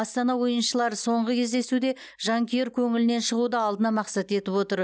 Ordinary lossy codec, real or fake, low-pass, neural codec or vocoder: none; real; none; none